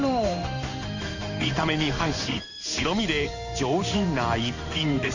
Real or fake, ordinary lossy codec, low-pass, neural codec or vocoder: fake; Opus, 64 kbps; 7.2 kHz; codec, 16 kHz in and 24 kHz out, 1 kbps, XY-Tokenizer